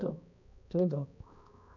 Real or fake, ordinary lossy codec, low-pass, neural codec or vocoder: fake; none; 7.2 kHz; codec, 16 kHz, 2 kbps, X-Codec, HuBERT features, trained on balanced general audio